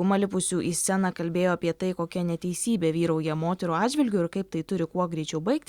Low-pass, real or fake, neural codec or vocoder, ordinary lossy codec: 19.8 kHz; real; none; Opus, 64 kbps